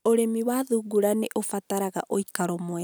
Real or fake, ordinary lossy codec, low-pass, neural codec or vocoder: real; none; none; none